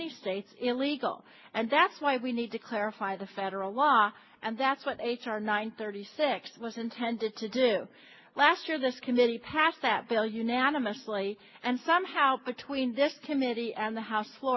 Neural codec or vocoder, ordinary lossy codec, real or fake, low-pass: none; MP3, 24 kbps; real; 7.2 kHz